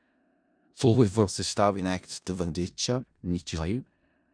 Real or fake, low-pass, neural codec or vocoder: fake; 9.9 kHz; codec, 16 kHz in and 24 kHz out, 0.4 kbps, LongCat-Audio-Codec, four codebook decoder